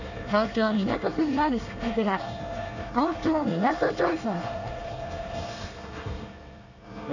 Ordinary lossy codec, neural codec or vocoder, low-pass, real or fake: none; codec, 24 kHz, 1 kbps, SNAC; 7.2 kHz; fake